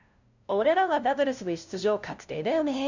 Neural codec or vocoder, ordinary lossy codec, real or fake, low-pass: codec, 16 kHz, 0.5 kbps, FunCodec, trained on LibriTTS, 25 frames a second; none; fake; 7.2 kHz